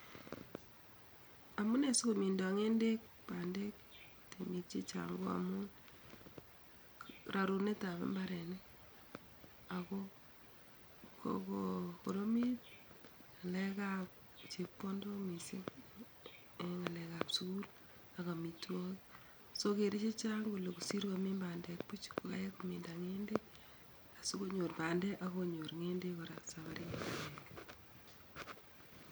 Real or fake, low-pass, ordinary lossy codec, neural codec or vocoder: real; none; none; none